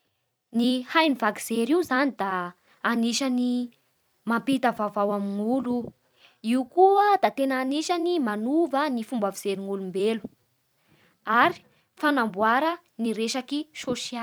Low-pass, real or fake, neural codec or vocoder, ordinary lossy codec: none; fake; vocoder, 44.1 kHz, 128 mel bands every 256 samples, BigVGAN v2; none